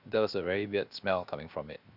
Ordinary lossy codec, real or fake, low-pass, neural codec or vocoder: none; fake; 5.4 kHz; codec, 16 kHz, 0.7 kbps, FocalCodec